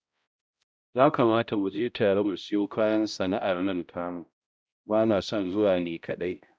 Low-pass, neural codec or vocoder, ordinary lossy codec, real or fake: none; codec, 16 kHz, 0.5 kbps, X-Codec, HuBERT features, trained on balanced general audio; none; fake